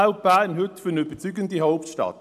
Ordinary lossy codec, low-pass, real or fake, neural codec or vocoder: AAC, 96 kbps; 14.4 kHz; real; none